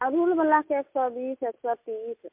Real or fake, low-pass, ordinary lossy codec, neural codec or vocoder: real; 3.6 kHz; MP3, 32 kbps; none